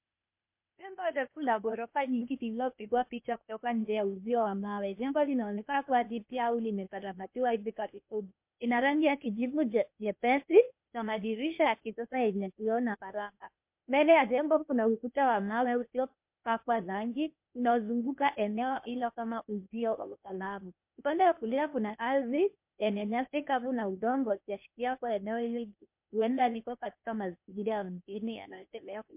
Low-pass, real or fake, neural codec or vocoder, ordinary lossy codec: 3.6 kHz; fake; codec, 16 kHz, 0.8 kbps, ZipCodec; MP3, 32 kbps